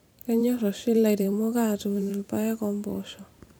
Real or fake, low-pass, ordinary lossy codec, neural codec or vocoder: fake; none; none; vocoder, 44.1 kHz, 128 mel bands every 512 samples, BigVGAN v2